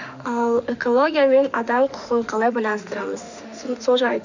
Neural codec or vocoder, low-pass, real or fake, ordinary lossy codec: autoencoder, 48 kHz, 32 numbers a frame, DAC-VAE, trained on Japanese speech; 7.2 kHz; fake; none